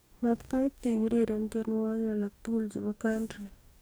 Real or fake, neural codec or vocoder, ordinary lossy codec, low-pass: fake; codec, 44.1 kHz, 2.6 kbps, DAC; none; none